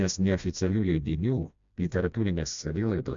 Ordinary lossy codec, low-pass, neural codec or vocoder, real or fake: MP3, 64 kbps; 7.2 kHz; codec, 16 kHz, 1 kbps, FreqCodec, smaller model; fake